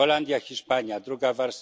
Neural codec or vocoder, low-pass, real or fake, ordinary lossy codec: none; none; real; none